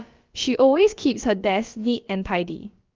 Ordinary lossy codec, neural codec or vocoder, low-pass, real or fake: Opus, 24 kbps; codec, 16 kHz, about 1 kbps, DyCAST, with the encoder's durations; 7.2 kHz; fake